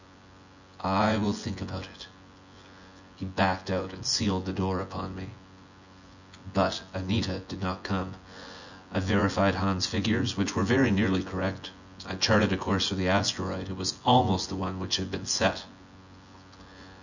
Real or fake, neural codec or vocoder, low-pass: fake; vocoder, 24 kHz, 100 mel bands, Vocos; 7.2 kHz